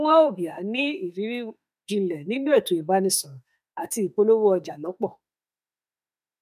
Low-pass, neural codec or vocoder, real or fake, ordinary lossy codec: 14.4 kHz; autoencoder, 48 kHz, 32 numbers a frame, DAC-VAE, trained on Japanese speech; fake; none